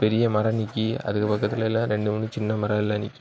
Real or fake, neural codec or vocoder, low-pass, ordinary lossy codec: real; none; none; none